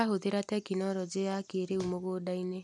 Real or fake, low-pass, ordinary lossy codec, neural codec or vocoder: real; none; none; none